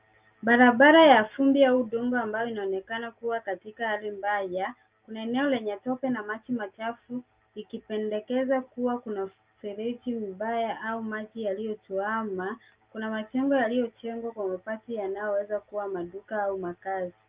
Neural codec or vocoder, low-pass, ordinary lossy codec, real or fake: none; 3.6 kHz; Opus, 32 kbps; real